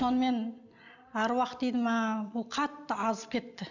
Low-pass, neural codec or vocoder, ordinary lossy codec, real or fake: 7.2 kHz; none; none; real